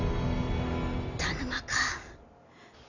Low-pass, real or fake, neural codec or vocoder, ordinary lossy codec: 7.2 kHz; real; none; none